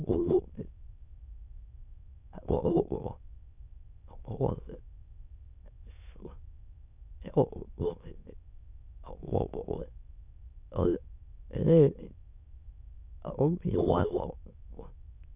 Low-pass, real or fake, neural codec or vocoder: 3.6 kHz; fake; autoencoder, 22.05 kHz, a latent of 192 numbers a frame, VITS, trained on many speakers